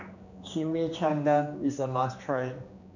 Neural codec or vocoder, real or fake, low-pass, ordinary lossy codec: codec, 16 kHz, 2 kbps, X-Codec, HuBERT features, trained on general audio; fake; 7.2 kHz; none